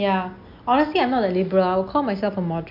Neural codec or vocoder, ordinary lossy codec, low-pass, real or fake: none; MP3, 48 kbps; 5.4 kHz; real